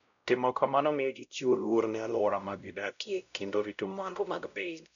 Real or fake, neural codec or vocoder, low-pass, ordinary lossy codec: fake; codec, 16 kHz, 0.5 kbps, X-Codec, WavLM features, trained on Multilingual LibriSpeech; 7.2 kHz; MP3, 96 kbps